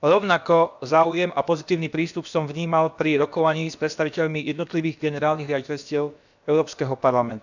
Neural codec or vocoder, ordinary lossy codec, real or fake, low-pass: codec, 16 kHz, about 1 kbps, DyCAST, with the encoder's durations; none; fake; 7.2 kHz